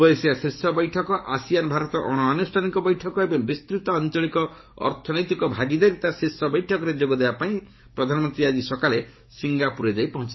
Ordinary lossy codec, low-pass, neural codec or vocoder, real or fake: MP3, 24 kbps; 7.2 kHz; codec, 44.1 kHz, 7.8 kbps, DAC; fake